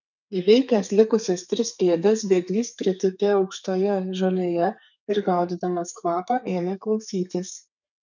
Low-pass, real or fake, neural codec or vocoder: 7.2 kHz; fake; codec, 32 kHz, 1.9 kbps, SNAC